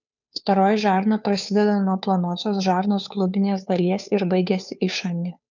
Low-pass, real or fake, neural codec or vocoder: 7.2 kHz; fake; codec, 16 kHz, 2 kbps, FunCodec, trained on Chinese and English, 25 frames a second